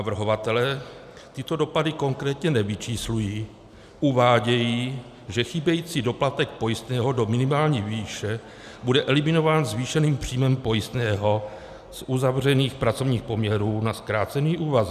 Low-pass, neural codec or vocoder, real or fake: 14.4 kHz; none; real